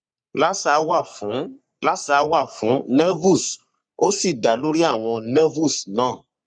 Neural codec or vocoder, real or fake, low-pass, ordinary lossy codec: codec, 44.1 kHz, 3.4 kbps, Pupu-Codec; fake; 9.9 kHz; none